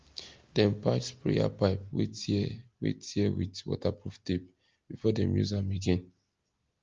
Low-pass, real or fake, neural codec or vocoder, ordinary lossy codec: 7.2 kHz; real; none; Opus, 32 kbps